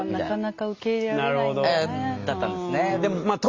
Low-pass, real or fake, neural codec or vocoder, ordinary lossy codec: 7.2 kHz; real; none; Opus, 32 kbps